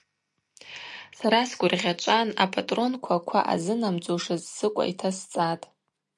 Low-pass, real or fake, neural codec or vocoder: 10.8 kHz; real; none